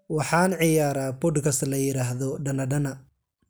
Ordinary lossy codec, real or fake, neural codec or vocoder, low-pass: none; real; none; none